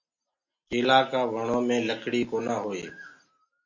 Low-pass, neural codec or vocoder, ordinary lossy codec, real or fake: 7.2 kHz; none; MP3, 48 kbps; real